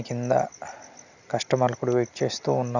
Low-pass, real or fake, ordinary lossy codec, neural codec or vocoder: 7.2 kHz; real; none; none